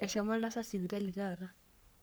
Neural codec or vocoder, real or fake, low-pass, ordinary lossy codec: codec, 44.1 kHz, 3.4 kbps, Pupu-Codec; fake; none; none